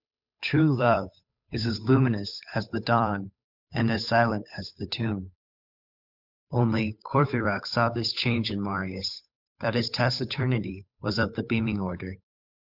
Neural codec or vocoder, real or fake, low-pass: codec, 16 kHz, 8 kbps, FunCodec, trained on Chinese and English, 25 frames a second; fake; 5.4 kHz